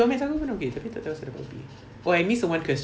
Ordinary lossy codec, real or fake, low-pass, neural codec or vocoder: none; real; none; none